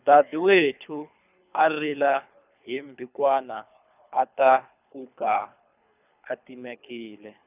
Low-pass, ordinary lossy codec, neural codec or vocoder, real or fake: 3.6 kHz; none; codec, 24 kHz, 3 kbps, HILCodec; fake